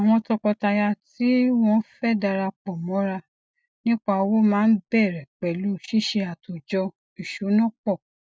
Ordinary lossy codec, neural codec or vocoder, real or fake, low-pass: none; none; real; none